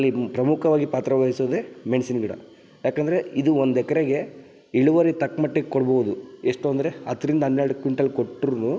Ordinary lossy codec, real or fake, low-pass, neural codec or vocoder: none; real; none; none